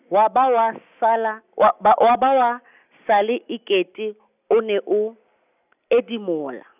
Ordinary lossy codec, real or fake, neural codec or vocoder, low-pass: none; real; none; 3.6 kHz